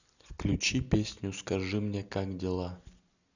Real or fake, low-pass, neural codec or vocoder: real; 7.2 kHz; none